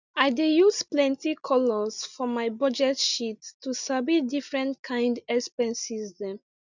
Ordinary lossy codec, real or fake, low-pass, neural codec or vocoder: none; real; 7.2 kHz; none